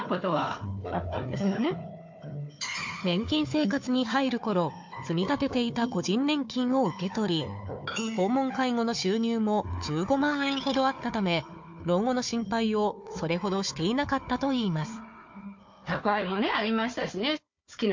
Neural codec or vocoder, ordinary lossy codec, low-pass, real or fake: codec, 16 kHz, 4 kbps, FunCodec, trained on Chinese and English, 50 frames a second; MP3, 48 kbps; 7.2 kHz; fake